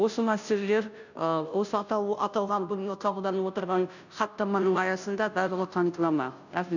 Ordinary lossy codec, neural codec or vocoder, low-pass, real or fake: none; codec, 16 kHz, 0.5 kbps, FunCodec, trained on Chinese and English, 25 frames a second; 7.2 kHz; fake